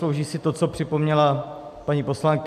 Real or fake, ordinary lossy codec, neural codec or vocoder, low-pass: real; AAC, 96 kbps; none; 14.4 kHz